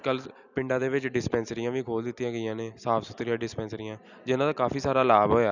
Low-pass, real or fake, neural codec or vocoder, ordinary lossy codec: 7.2 kHz; real; none; none